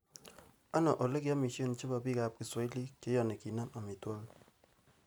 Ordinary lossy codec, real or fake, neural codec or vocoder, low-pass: none; real; none; none